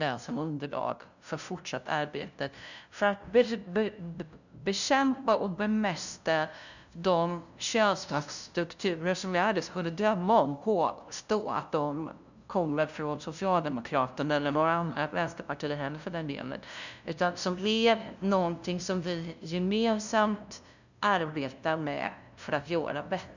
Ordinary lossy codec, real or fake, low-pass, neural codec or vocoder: none; fake; 7.2 kHz; codec, 16 kHz, 0.5 kbps, FunCodec, trained on LibriTTS, 25 frames a second